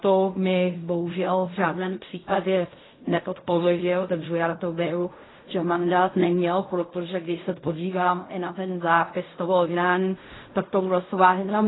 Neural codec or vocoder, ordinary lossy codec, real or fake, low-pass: codec, 16 kHz in and 24 kHz out, 0.4 kbps, LongCat-Audio-Codec, fine tuned four codebook decoder; AAC, 16 kbps; fake; 7.2 kHz